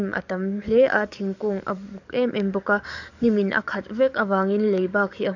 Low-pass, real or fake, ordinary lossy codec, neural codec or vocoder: 7.2 kHz; fake; none; codec, 44.1 kHz, 7.8 kbps, DAC